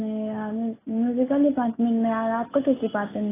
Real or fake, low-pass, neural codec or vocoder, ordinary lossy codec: real; 3.6 kHz; none; AAC, 16 kbps